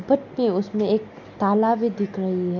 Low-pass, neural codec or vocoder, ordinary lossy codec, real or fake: 7.2 kHz; none; none; real